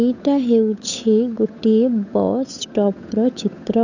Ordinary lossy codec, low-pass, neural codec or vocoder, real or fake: none; 7.2 kHz; codec, 16 kHz, 8 kbps, FunCodec, trained on Chinese and English, 25 frames a second; fake